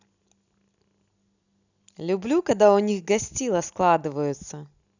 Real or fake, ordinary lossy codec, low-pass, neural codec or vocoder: real; none; 7.2 kHz; none